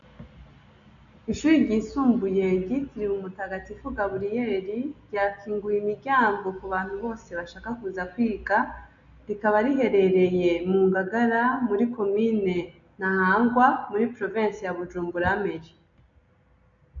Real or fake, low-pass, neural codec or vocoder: real; 7.2 kHz; none